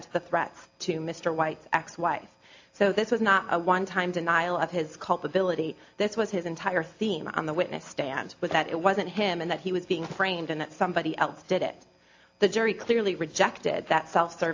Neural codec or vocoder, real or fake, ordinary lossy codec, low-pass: none; real; AAC, 48 kbps; 7.2 kHz